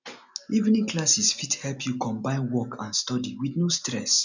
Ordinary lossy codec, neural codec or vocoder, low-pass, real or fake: none; none; 7.2 kHz; real